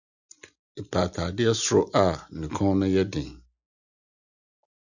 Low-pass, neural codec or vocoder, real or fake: 7.2 kHz; none; real